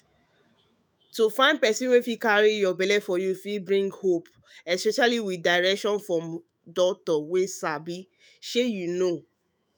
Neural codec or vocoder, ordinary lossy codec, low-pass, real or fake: autoencoder, 48 kHz, 128 numbers a frame, DAC-VAE, trained on Japanese speech; none; none; fake